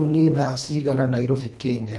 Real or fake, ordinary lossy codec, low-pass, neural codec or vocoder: fake; none; none; codec, 24 kHz, 1.5 kbps, HILCodec